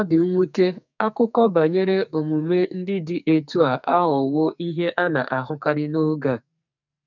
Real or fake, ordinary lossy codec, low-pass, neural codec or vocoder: fake; none; 7.2 kHz; codec, 44.1 kHz, 2.6 kbps, SNAC